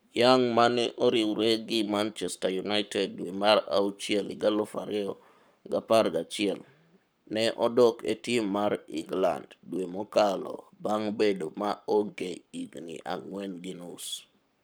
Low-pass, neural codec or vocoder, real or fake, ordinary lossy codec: none; codec, 44.1 kHz, 7.8 kbps, Pupu-Codec; fake; none